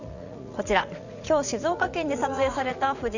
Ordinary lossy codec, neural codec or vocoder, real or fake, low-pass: none; vocoder, 44.1 kHz, 80 mel bands, Vocos; fake; 7.2 kHz